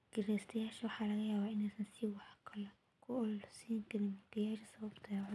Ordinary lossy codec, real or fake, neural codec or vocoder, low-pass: none; real; none; none